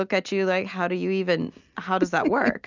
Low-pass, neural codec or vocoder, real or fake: 7.2 kHz; none; real